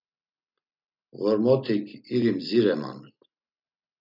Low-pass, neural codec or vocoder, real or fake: 5.4 kHz; none; real